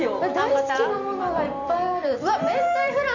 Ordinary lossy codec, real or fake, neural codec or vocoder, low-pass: none; fake; vocoder, 44.1 kHz, 128 mel bands every 256 samples, BigVGAN v2; 7.2 kHz